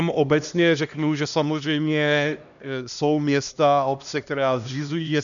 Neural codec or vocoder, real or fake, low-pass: codec, 16 kHz, 1 kbps, X-Codec, HuBERT features, trained on LibriSpeech; fake; 7.2 kHz